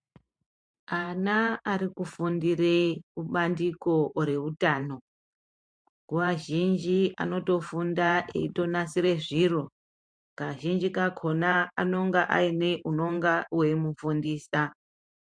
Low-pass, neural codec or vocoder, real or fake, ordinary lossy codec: 9.9 kHz; vocoder, 44.1 kHz, 128 mel bands every 512 samples, BigVGAN v2; fake; MP3, 64 kbps